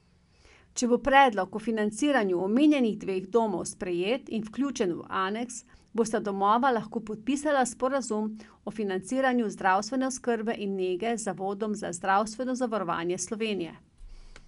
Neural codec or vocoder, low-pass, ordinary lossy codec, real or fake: none; 10.8 kHz; none; real